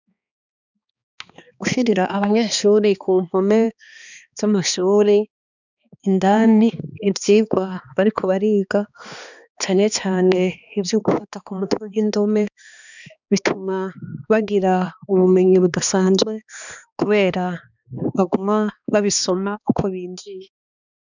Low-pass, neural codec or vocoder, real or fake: 7.2 kHz; codec, 16 kHz, 2 kbps, X-Codec, HuBERT features, trained on balanced general audio; fake